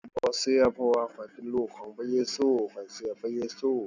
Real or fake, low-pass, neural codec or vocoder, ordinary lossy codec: real; 7.2 kHz; none; none